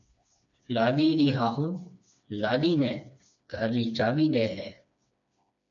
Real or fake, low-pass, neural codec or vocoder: fake; 7.2 kHz; codec, 16 kHz, 2 kbps, FreqCodec, smaller model